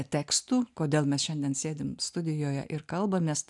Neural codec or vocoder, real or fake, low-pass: none; real; 10.8 kHz